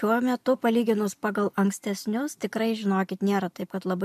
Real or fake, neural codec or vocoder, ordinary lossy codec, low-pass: real; none; MP3, 96 kbps; 14.4 kHz